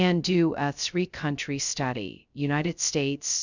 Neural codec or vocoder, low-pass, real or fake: codec, 16 kHz, 0.2 kbps, FocalCodec; 7.2 kHz; fake